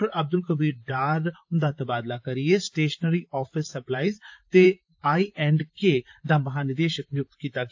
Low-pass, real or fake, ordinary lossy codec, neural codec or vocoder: 7.2 kHz; fake; AAC, 48 kbps; vocoder, 44.1 kHz, 128 mel bands, Pupu-Vocoder